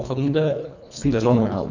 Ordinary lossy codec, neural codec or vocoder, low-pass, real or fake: none; codec, 24 kHz, 1.5 kbps, HILCodec; 7.2 kHz; fake